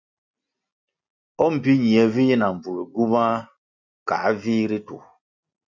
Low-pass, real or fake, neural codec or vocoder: 7.2 kHz; real; none